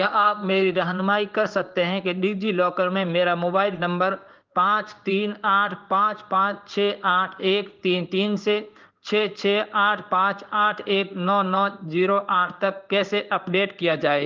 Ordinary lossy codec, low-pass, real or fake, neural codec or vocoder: Opus, 24 kbps; 7.2 kHz; fake; codec, 16 kHz in and 24 kHz out, 1 kbps, XY-Tokenizer